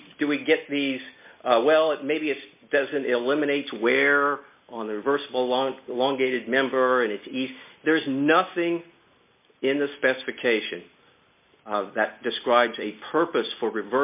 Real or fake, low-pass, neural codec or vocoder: real; 3.6 kHz; none